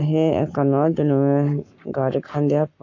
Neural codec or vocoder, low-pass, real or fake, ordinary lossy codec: codec, 44.1 kHz, 7.8 kbps, Pupu-Codec; 7.2 kHz; fake; AAC, 48 kbps